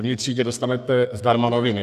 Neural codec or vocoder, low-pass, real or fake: codec, 32 kHz, 1.9 kbps, SNAC; 14.4 kHz; fake